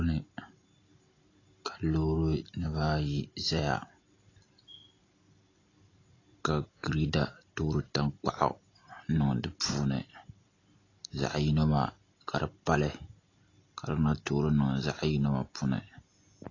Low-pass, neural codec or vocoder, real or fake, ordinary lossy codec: 7.2 kHz; none; real; AAC, 32 kbps